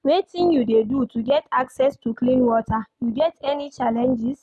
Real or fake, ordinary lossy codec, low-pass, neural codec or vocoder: real; none; none; none